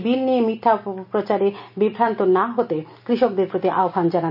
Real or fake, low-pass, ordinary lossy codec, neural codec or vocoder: real; 5.4 kHz; none; none